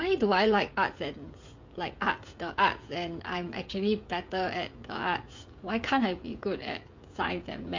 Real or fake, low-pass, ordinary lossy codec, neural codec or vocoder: fake; 7.2 kHz; MP3, 48 kbps; codec, 16 kHz, 16 kbps, FreqCodec, smaller model